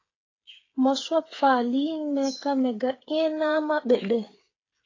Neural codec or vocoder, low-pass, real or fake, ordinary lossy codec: codec, 16 kHz, 8 kbps, FreqCodec, smaller model; 7.2 kHz; fake; AAC, 32 kbps